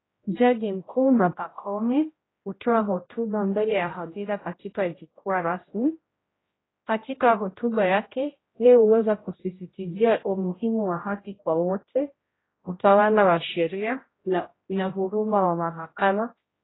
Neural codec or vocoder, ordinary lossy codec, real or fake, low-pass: codec, 16 kHz, 0.5 kbps, X-Codec, HuBERT features, trained on general audio; AAC, 16 kbps; fake; 7.2 kHz